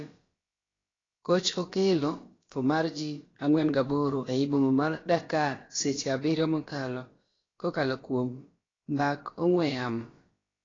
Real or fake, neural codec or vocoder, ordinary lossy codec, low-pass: fake; codec, 16 kHz, about 1 kbps, DyCAST, with the encoder's durations; AAC, 32 kbps; 7.2 kHz